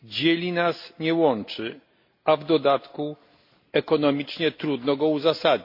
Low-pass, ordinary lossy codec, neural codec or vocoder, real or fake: 5.4 kHz; MP3, 32 kbps; none; real